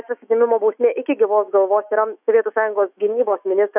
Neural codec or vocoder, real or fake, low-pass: autoencoder, 48 kHz, 128 numbers a frame, DAC-VAE, trained on Japanese speech; fake; 3.6 kHz